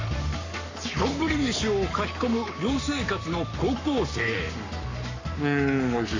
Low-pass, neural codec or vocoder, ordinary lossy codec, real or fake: 7.2 kHz; codec, 44.1 kHz, 7.8 kbps, Pupu-Codec; AAC, 48 kbps; fake